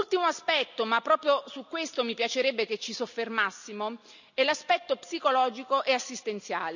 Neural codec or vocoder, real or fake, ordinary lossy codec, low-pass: none; real; none; 7.2 kHz